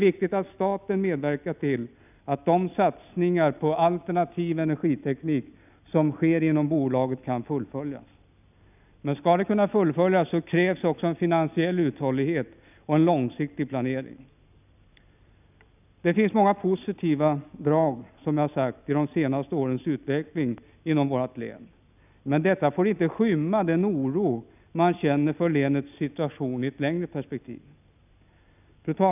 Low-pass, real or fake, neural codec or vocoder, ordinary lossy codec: 3.6 kHz; real; none; none